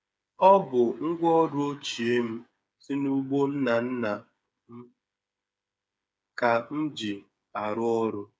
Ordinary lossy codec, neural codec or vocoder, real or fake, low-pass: none; codec, 16 kHz, 8 kbps, FreqCodec, smaller model; fake; none